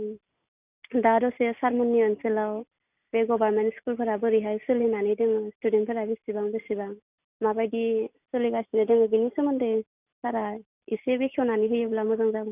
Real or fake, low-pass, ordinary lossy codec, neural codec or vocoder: real; 3.6 kHz; none; none